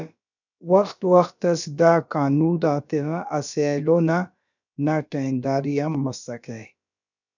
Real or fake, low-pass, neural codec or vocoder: fake; 7.2 kHz; codec, 16 kHz, about 1 kbps, DyCAST, with the encoder's durations